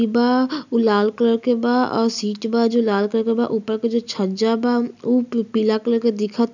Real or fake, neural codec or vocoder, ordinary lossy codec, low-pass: real; none; none; 7.2 kHz